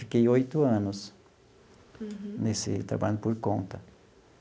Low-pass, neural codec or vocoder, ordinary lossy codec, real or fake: none; none; none; real